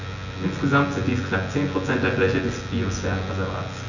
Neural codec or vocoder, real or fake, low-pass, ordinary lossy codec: vocoder, 24 kHz, 100 mel bands, Vocos; fake; 7.2 kHz; none